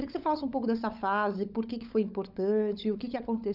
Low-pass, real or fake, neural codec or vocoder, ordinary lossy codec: 5.4 kHz; fake; codec, 16 kHz, 16 kbps, FunCodec, trained on LibriTTS, 50 frames a second; none